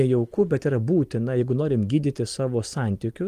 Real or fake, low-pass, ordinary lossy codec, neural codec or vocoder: real; 14.4 kHz; Opus, 24 kbps; none